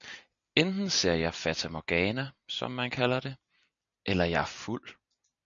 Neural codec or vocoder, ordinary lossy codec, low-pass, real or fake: none; MP3, 96 kbps; 7.2 kHz; real